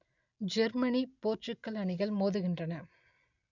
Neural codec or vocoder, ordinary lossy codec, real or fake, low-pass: none; none; real; 7.2 kHz